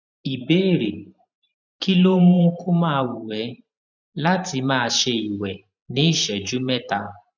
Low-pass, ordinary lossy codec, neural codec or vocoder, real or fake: 7.2 kHz; none; vocoder, 44.1 kHz, 128 mel bands every 512 samples, BigVGAN v2; fake